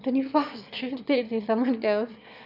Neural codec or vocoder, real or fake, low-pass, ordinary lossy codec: autoencoder, 22.05 kHz, a latent of 192 numbers a frame, VITS, trained on one speaker; fake; 5.4 kHz; none